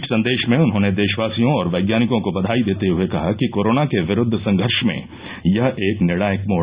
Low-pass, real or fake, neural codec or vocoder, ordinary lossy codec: 3.6 kHz; real; none; Opus, 64 kbps